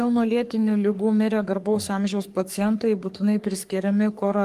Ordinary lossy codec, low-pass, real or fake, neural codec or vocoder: Opus, 32 kbps; 14.4 kHz; fake; codec, 44.1 kHz, 3.4 kbps, Pupu-Codec